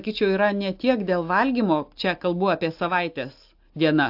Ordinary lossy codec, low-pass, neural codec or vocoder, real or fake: AAC, 48 kbps; 5.4 kHz; none; real